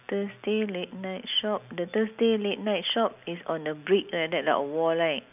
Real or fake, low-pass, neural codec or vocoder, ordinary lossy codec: real; 3.6 kHz; none; none